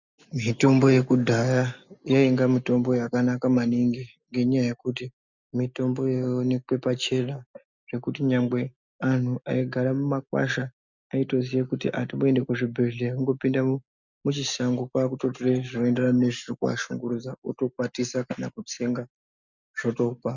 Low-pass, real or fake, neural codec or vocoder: 7.2 kHz; real; none